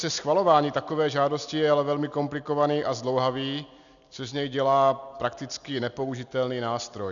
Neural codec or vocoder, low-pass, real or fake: none; 7.2 kHz; real